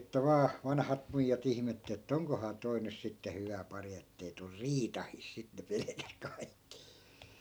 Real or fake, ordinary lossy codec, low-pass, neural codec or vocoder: real; none; none; none